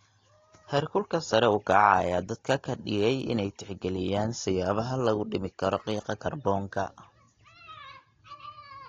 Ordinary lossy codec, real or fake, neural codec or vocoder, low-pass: AAC, 32 kbps; real; none; 7.2 kHz